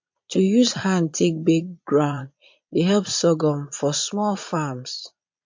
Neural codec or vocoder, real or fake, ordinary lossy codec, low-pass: vocoder, 22.05 kHz, 80 mel bands, Vocos; fake; MP3, 48 kbps; 7.2 kHz